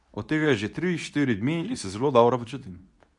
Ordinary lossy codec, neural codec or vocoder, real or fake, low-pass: none; codec, 24 kHz, 0.9 kbps, WavTokenizer, medium speech release version 2; fake; 10.8 kHz